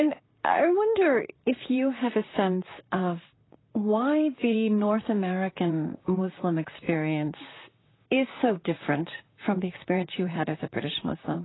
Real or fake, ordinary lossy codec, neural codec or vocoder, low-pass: fake; AAC, 16 kbps; vocoder, 44.1 kHz, 128 mel bands, Pupu-Vocoder; 7.2 kHz